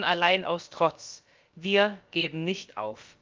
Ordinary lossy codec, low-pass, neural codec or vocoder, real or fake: Opus, 24 kbps; 7.2 kHz; codec, 16 kHz, about 1 kbps, DyCAST, with the encoder's durations; fake